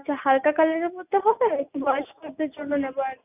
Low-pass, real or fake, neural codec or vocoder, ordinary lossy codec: 3.6 kHz; real; none; none